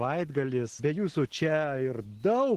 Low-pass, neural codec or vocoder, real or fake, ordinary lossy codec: 14.4 kHz; none; real; Opus, 16 kbps